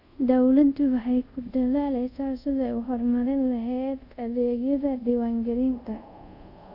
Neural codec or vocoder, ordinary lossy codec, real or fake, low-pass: codec, 24 kHz, 0.5 kbps, DualCodec; none; fake; 5.4 kHz